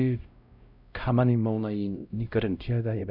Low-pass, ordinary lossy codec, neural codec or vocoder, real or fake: 5.4 kHz; none; codec, 16 kHz, 0.5 kbps, X-Codec, WavLM features, trained on Multilingual LibriSpeech; fake